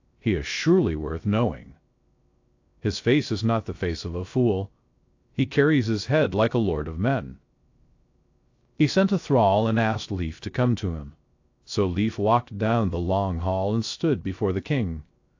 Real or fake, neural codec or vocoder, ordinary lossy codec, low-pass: fake; codec, 16 kHz, 0.3 kbps, FocalCodec; AAC, 48 kbps; 7.2 kHz